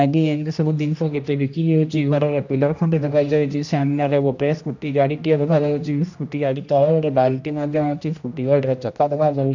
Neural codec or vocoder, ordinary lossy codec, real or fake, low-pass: codec, 16 kHz, 1 kbps, X-Codec, HuBERT features, trained on general audio; none; fake; 7.2 kHz